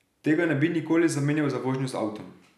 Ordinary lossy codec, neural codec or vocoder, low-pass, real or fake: none; none; 14.4 kHz; real